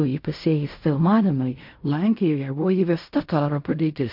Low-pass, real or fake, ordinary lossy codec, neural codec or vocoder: 5.4 kHz; fake; MP3, 48 kbps; codec, 16 kHz in and 24 kHz out, 0.4 kbps, LongCat-Audio-Codec, fine tuned four codebook decoder